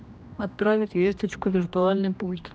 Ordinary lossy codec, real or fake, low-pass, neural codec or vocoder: none; fake; none; codec, 16 kHz, 1 kbps, X-Codec, HuBERT features, trained on general audio